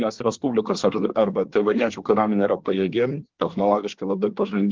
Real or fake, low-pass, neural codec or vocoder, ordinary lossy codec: fake; 7.2 kHz; codec, 24 kHz, 1 kbps, SNAC; Opus, 16 kbps